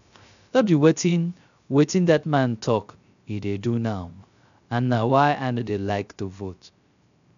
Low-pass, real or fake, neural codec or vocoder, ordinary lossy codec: 7.2 kHz; fake; codec, 16 kHz, 0.3 kbps, FocalCodec; none